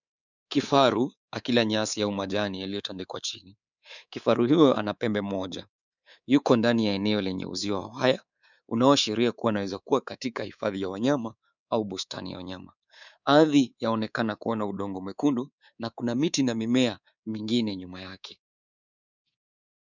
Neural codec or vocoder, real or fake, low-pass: codec, 24 kHz, 3.1 kbps, DualCodec; fake; 7.2 kHz